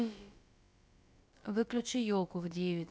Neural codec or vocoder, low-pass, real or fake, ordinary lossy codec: codec, 16 kHz, about 1 kbps, DyCAST, with the encoder's durations; none; fake; none